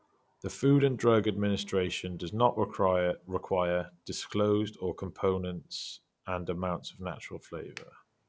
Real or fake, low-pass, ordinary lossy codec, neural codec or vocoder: real; none; none; none